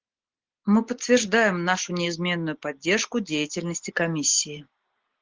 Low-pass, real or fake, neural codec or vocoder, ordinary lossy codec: 7.2 kHz; real; none; Opus, 16 kbps